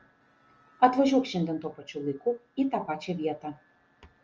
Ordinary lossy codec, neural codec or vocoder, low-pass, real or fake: Opus, 24 kbps; none; 7.2 kHz; real